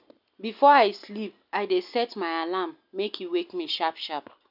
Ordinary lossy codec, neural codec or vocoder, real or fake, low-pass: none; none; real; 5.4 kHz